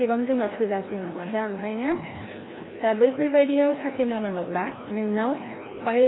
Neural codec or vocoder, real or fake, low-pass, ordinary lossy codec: codec, 16 kHz, 1 kbps, FreqCodec, larger model; fake; 7.2 kHz; AAC, 16 kbps